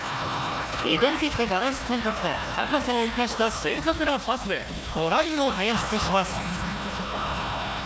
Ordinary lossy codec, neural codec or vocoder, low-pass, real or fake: none; codec, 16 kHz, 1 kbps, FunCodec, trained on Chinese and English, 50 frames a second; none; fake